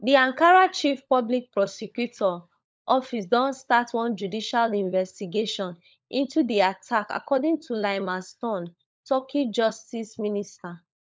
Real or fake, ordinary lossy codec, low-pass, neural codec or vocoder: fake; none; none; codec, 16 kHz, 16 kbps, FunCodec, trained on LibriTTS, 50 frames a second